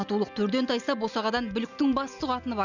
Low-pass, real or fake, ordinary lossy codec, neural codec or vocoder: 7.2 kHz; real; none; none